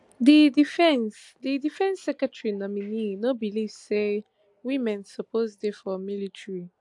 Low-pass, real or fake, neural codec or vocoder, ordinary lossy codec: 10.8 kHz; real; none; AAC, 64 kbps